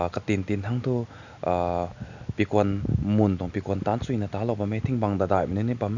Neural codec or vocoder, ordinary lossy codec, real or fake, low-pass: none; none; real; 7.2 kHz